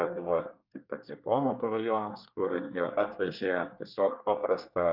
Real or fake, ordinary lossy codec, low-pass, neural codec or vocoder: fake; Opus, 64 kbps; 5.4 kHz; codec, 24 kHz, 1 kbps, SNAC